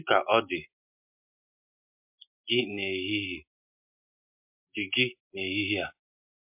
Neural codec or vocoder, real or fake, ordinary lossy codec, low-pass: none; real; MP3, 32 kbps; 3.6 kHz